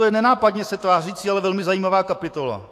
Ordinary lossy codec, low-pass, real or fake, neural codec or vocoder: AAC, 64 kbps; 14.4 kHz; fake; autoencoder, 48 kHz, 128 numbers a frame, DAC-VAE, trained on Japanese speech